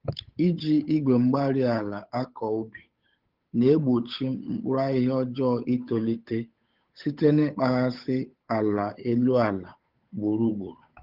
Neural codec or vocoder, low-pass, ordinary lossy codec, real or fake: codec, 16 kHz, 8 kbps, FunCodec, trained on Chinese and English, 25 frames a second; 5.4 kHz; Opus, 24 kbps; fake